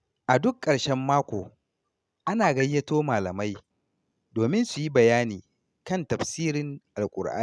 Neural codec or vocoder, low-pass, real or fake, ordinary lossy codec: none; none; real; none